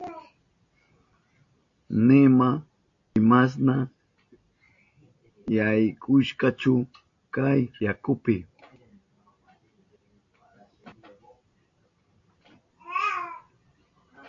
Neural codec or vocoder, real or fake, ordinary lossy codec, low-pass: none; real; AAC, 48 kbps; 7.2 kHz